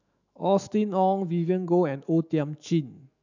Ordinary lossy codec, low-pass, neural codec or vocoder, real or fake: none; 7.2 kHz; autoencoder, 48 kHz, 128 numbers a frame, DAC-VAE, trained on Japanese speech; fake